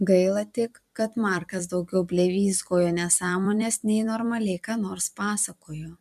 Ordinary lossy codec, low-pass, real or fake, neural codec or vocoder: MP3, 96 kbps; 14.4 kHz; fake; vocoder, 44.1 kHz, 128 mel bands every 256 samples, BigVGAN v2